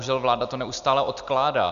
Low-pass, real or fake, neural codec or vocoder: 7.2 kHz; real; none